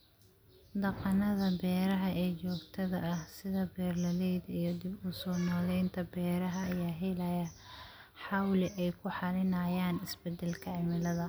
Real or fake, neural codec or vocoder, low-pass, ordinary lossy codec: real; none; none; none